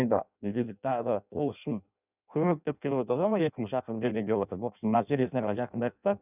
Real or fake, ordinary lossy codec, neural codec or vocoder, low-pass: fake; none; codec, 16 kHz in and 24 kHz out, 0.6 kbps, FireRedTTS-2 codec; 3.6 kHz